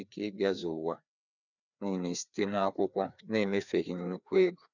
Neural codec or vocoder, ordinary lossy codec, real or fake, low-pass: codec, 16 kHz, 2 kbps, FreqCodec, larger model; none; fake; 7.2 kHz